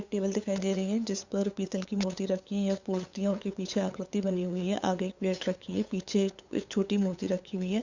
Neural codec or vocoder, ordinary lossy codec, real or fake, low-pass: codec, 16 kHz, 8 kbps, FunCodec, trained on LibriTTS, 25 frames a second; Opus, 64 kbps; fake; 7.2 kHz